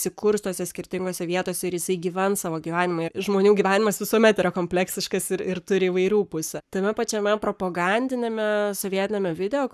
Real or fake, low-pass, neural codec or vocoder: fake; 14.4 kHz; codec, 44.1 kHz, 7.8 kbps, Pupu-Codec